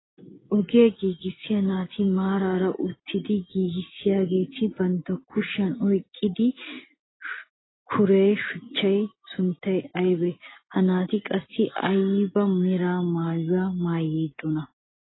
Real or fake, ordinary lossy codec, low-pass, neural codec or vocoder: real; AAC, 16 kbps; 7.2 kHz; none